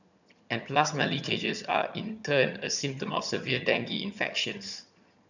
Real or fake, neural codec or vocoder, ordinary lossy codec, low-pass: fake; vocoder, 22.05 kHz, 80 mel bands, HiFi-GAN; none; 7.2 kHz